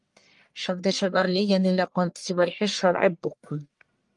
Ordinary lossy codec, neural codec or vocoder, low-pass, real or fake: Opus, 32 kbps; codec, 44.1 kHz, 1.7 kbps, Pupu-Codec; 10.8 kHz; fake